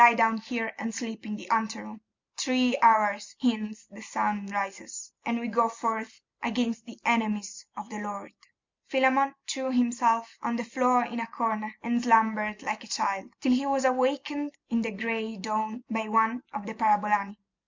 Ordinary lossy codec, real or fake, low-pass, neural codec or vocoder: MP3, 64 kbps; real; 7.2 kHz; none